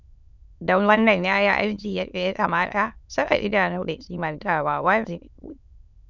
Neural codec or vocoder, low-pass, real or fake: autoencoder, 22.05 kHz, a latent of 192 numbers a frame, VITS, trained on many speakers; 7.2 kHz; fake